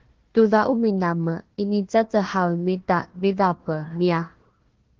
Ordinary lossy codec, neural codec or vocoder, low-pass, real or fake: Opus, 16 kbps; codec, 16 kHz, 1 kbps, FunCodec, trained on Chinese and English, 50 frames a second; 7.2 kHz; fake